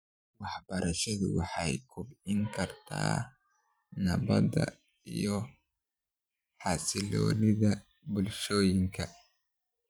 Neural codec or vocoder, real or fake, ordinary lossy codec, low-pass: vocoder, 44.1 kHz, 128 mel bands every 512 samples, BigVGAN v2; fake; none; none